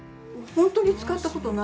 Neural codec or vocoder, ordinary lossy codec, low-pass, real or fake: none; none; none; real